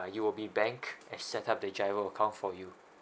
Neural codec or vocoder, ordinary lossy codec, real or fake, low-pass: none; none; real; none